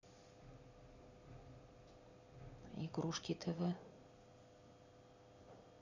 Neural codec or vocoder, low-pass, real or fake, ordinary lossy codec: none; 7.2 kHz; real; none